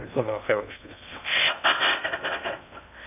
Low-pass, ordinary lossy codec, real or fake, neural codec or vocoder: 3.6 kHz; none; fake; codec, 16 kHz in and 24 kHz out, 0.6 kbps, FocalCodec, streaming, 2048 codes